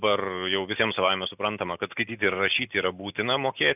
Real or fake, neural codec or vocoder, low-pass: real; none; 3.6 kHz